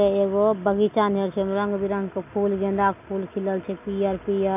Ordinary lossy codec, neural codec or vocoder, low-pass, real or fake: none; none; 3.6 kHz; real